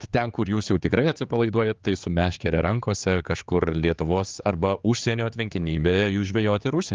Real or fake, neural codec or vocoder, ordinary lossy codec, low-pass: fake; codec, 16 kHz, 4 kbps, X-Codec, HuBERT features, trained on general audio; Opus, 32 kbps; 7.2 kHz